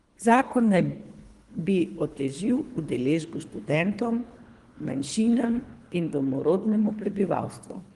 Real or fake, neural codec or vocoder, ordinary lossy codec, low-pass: fake; codec, 24 kHz, 3 kbps, HILCodec; Opus, 24 kbps; 10.8 kHz